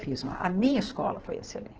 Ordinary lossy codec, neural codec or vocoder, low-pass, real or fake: Opus, 16 kbps; codec, 16 kHz in and 24 kHz out, 2.2 kbps, FireRedTTS-2 codec; 7.2 kHz; fake